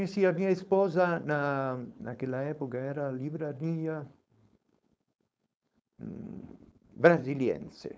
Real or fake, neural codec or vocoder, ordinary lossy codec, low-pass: fake; codec, 16 kHz, 4.8 kbps, FACodec; none; none